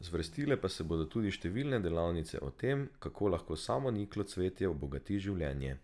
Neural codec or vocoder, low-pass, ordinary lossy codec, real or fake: none; none; none; real